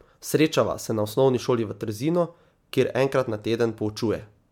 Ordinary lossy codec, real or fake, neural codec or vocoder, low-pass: MP3, 96 kbps; real; none; 19.8 kHz